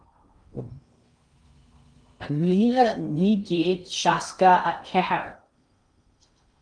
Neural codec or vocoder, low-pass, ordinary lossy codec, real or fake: codec, 16 kHz in and 24 kHz out, 0.6 kbps, FocalCodec, streaming, 2048 codes; 9.9 kHz; Opus, 32 kbps; fake